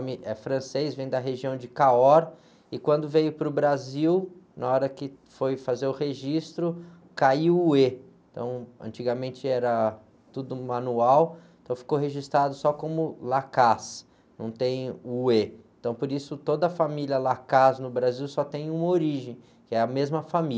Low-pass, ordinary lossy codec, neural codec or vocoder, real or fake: none; none; none; real